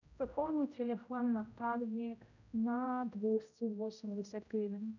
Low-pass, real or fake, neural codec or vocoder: 7.2 kHz; fake; codec, 16 kHz, 0.5 kbps, X-Codec, HuBERT features, trained on general audio